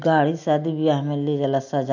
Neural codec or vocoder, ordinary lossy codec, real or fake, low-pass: none; none; real; 7.2 kHz